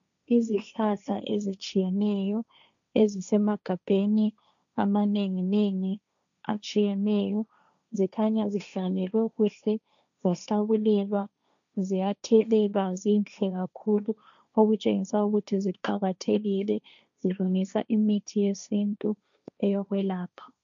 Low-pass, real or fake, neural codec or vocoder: 7.2 kHz; fake; codec, 16 kHz, 1.1 kbps, Voila-Tokenizer